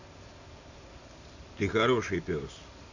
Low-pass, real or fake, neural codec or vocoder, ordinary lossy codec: 7.2 kHz; real; none; none